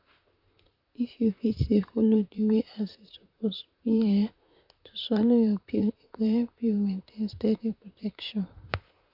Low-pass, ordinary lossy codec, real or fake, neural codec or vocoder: 5.4 kHz; none; fake; vocoder, 44.1 kHz, 128 mel bands, Pupu-Vocoder